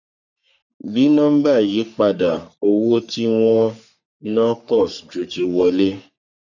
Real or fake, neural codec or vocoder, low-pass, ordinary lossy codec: fake; codec, 44.1 kHz, 3.4 kbps, Pupu-Codec; 7.2 kHz; none